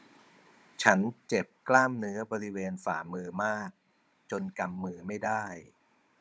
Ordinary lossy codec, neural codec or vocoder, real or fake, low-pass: none; codec, 16 kHz, 16 kbps, FunCodec, trained on Chinese and English, 50 frames a second; fake; none